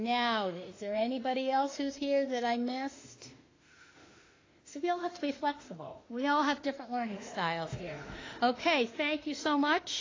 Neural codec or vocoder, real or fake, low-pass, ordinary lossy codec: autoencoder, 48 kHz, 32 numbers a frame, DAC-VAE, trained on Japanese speech; fake; 7.2 kHz; AAC, 32 kbps